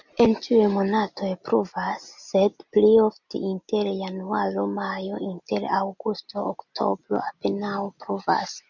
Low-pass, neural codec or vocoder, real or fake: 7.2 kHz; none; real